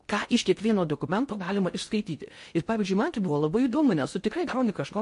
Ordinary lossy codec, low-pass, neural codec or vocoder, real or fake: MP3, 48 kbps; 10.8 kHz; codec, 16 kHz in and 24 kHz out, 0.6 kbps, FocalCodec, streaming, 4096 codes; fake